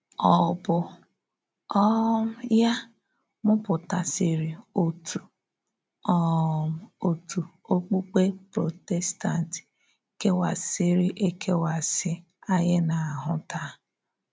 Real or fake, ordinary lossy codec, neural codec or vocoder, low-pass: real; none; none; none